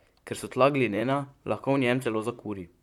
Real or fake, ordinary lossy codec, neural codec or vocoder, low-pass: fake; none; vocoder, 44.1 kHz, 128 mel bands, Pupu-Vocoder; 19.8 kHz